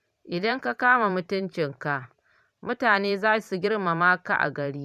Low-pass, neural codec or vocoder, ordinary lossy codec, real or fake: 14.4 kHz; vocoder, 44.1 kHz, 128 mel bands every 512 samples, BigVGAN v2; none; fake